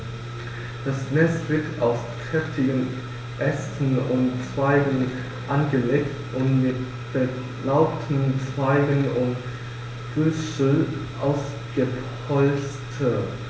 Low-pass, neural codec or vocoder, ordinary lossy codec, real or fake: none; none; none; real